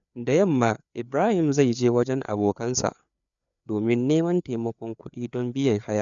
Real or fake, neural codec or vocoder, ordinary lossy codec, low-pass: fake; codec, 16 kHz, 4 kbps, FreqCodec, larger model; none; 7.2 kHz